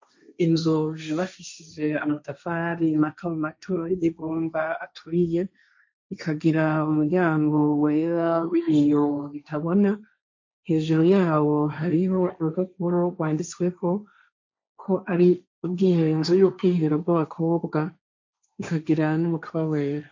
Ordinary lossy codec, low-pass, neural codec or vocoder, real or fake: MP3, 48 kbps; 7.2 kHz; codec, 16 kHz, 1.1 kbps, Voila-Tokenizer; fake